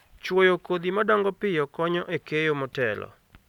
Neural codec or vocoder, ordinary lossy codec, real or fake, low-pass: none; none; real; 19.8 kHz